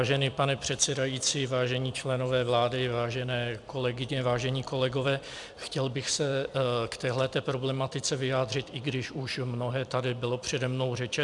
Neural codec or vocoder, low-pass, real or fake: vocoder, 44.1 kHz, 128 mel bands every 256 samples, BigVGAN v2; 10.8 kHz; fake